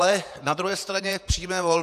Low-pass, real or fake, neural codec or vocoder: 14.4 kHz; fake; vocoder, 48 kHz, 128 mel bands, Vocos